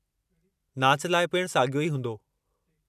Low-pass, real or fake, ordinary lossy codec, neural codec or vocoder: 14.4 kHz; real; none; none